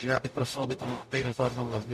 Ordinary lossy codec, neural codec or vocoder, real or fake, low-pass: MP3, 64 kbps; codec, 44.1 kHz, 0.9 kbps, DAC; fake; 14.4 kHz